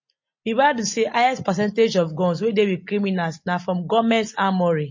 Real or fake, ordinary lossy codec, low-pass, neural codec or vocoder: real; MP3, 32 kbps; 7.2 kHz; none